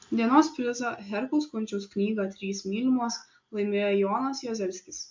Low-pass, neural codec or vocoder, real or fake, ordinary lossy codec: 7.2 kHz; none; real; MP3, 48 kbps